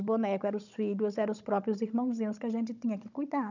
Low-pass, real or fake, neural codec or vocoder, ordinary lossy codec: 7.2 kHz; fake; codec, 16 kHz, 16 kbps, FunCodec, trained on Chinese and English, 50 frames a second; none